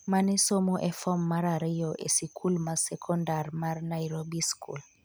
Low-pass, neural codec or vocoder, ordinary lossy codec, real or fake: none; none; none; real